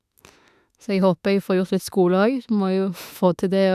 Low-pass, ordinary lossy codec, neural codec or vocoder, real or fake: 14.4 kHz; none; autoencoder, 48 kHz, 32 numbers a frame, DAC-VAE, trained on Japanese speech; fake